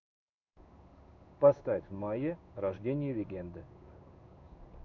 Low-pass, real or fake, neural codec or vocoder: 7.2 kHz; fake; codec, 16 kHz in and 24 kHz out, 1 kbps, XY-Tokenizer